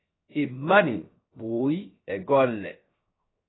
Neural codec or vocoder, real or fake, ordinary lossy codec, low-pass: codec, 16 kHz, 0.3 kbps, FocalCodec; fake; AAC, 16 kbps; 7.2 kHz